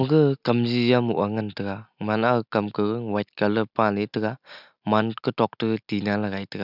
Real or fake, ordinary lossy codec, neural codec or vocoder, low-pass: real; none; none; 5.4 kHz